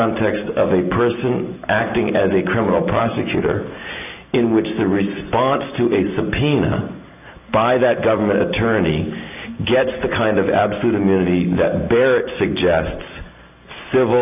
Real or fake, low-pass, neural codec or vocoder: real; 3.6 kHz; none